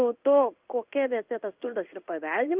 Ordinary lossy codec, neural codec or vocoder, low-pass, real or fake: Opus, 24 kbps; codec, 16 kHz in and 24 kHz out, 1 kbps, XY-Tokenizer; 3.6 kHz; fake